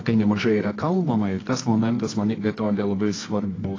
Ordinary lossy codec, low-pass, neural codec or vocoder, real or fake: AAC, 32 kbps; 7.2 kHz; codec, 24 kHz, 0.9 kbps, WavTokenizer, medium music audio release; fake